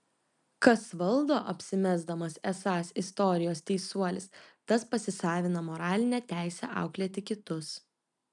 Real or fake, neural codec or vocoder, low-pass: real; none; 10.8 kHz